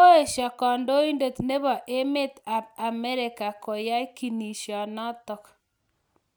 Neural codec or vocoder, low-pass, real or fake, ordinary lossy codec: none; none; real; none